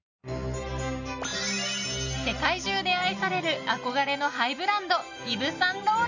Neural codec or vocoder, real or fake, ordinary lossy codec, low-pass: none; real; none; 7.2 kHz